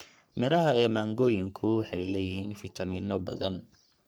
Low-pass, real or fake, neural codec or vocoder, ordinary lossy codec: none; fake; codec, 44.1 kHz, 3.4 kbps, Pupu-Codec; none